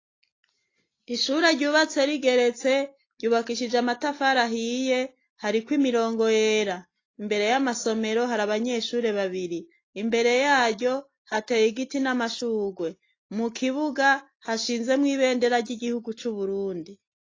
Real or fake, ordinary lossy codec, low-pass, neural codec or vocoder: real; AAC, 32 kbps; 7.2 kHz; none